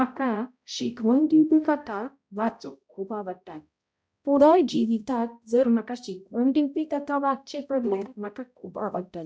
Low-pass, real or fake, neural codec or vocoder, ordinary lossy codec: none; fake; codec, 16 kHz, 0.5 kbps, X-Codec, HuBERT features, trained on balanced general audio; none